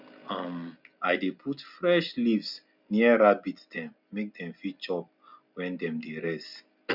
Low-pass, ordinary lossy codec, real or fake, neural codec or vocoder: 5.4 kHz; none; real; none